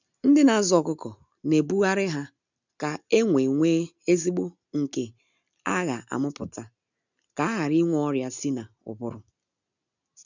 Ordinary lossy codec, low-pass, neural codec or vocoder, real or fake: none; 7.2 kHz; none; real